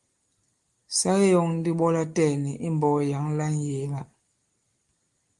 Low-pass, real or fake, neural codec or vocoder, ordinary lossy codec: 10.8 kHz; real; none; Opus, 24 kbps